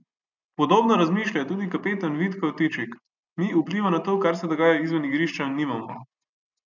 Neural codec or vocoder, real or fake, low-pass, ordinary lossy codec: none; real; 7.2 kHz; none